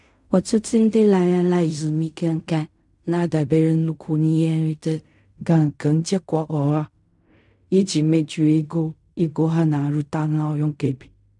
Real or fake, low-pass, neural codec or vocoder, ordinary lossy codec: fake; 10.8 kHz; codec, 16 kHz in and 24 kHz out, 0.4 kbps, LongCat-Audio-Codec, fine tuned four codebook decoder; none